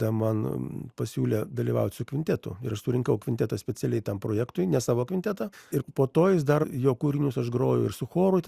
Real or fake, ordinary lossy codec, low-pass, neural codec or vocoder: real; Opus, 64 kbps; 14.4 kHz; none